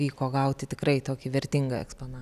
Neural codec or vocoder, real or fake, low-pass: none; real; 14.4 kHz